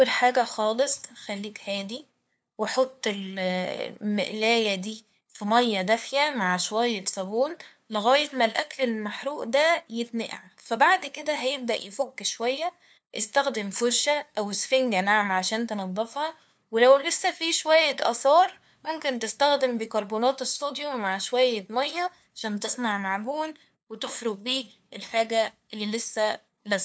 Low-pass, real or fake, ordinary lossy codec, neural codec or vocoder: none; fake; none; codec, 16 kHz, 2 kbps, FunCodec, trained on LibriTTS, 25 frames a second